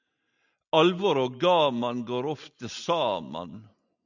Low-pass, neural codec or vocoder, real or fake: 7.2 kHz; none; real